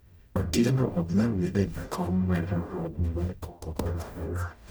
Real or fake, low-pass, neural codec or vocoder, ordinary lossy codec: fake; none; codec, 44.1 kHz, 0.9 kbps, DAC; none